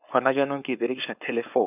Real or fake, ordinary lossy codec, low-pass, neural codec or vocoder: fake; none; 3.6 kHz; codec, 16 kHz, 4.8 kbps, FACodec